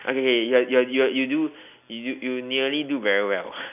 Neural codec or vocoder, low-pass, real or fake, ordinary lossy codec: none; 3.6 kHz; real; none